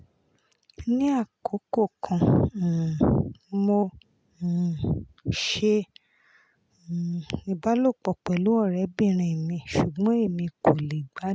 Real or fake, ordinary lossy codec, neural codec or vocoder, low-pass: real; none; none; none